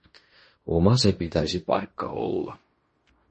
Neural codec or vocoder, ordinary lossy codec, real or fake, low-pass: codec, 16 kHz in and 24 kHz out, 0.9 kbps, LongCat-Audio-Codec, fine tuned four codebook decoder; MP3, 32 kbps; fake; 10.8 kHz